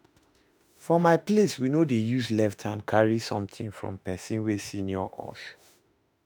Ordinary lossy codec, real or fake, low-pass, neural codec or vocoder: none; fake; none; autoencoder, 48 kHz, 32 numbers a frame, DAC-VAE, trained on Japanese speech